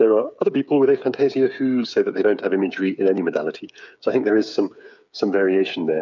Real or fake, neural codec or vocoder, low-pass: fake; codec, 16 kHz, 8 kbps, FreqCodec, smaller model; 7.2 kHz